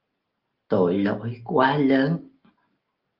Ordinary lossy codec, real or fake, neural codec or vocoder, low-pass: Opus, 32 kbps; real; none; 5.4 kHz